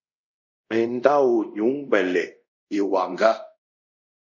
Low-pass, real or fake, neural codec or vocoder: 7.2 kHz; fake; codec, 24 kHz, 0.5 kbps, DualCodec